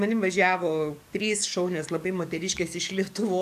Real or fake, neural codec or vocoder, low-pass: fake; codec, 44.1 kHz, 7.8 kbps, DAC; 14.4 kHz